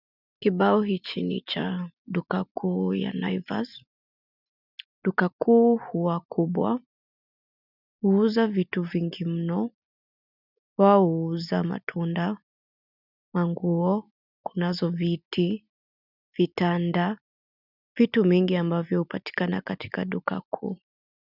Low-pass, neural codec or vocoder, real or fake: 5.4 kHz; none; real